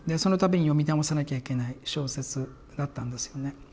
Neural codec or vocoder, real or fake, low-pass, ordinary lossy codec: none; real; none; none